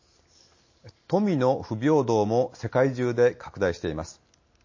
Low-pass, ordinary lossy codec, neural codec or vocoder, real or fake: 7.2 kHz; MP3, 32 kbps; none; real